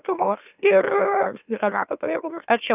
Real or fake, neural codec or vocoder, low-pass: fake; autoencoder, 44.1 kHz, a latent of 192 numbers a frame, MeloTTS; 3.6 kHz